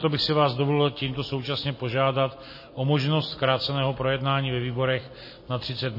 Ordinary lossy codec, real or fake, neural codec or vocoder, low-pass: MP3, 24 kbps; real; none; 5.4 kHz